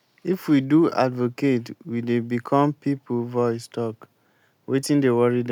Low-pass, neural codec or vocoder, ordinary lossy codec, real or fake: 19.8 kHz; none; none; real